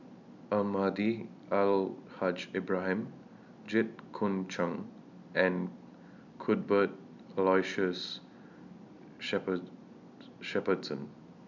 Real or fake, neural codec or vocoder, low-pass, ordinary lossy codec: real; none; 7.2 kHz; none